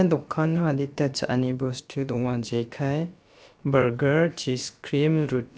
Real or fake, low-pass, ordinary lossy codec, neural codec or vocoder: fake; none; none; codec, 16 kHz, about 1 kbps, DyCAST, with the encoder's durations